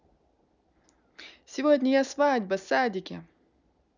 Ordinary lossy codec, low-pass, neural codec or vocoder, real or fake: none; 7.2 kHz; none; real